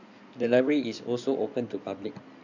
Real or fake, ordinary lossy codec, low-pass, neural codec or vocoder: fake; none; 7.2 kHz; codec, 16 kHz in and 24 kHz out, 2.2 kbps, FireRedTTS-2 codec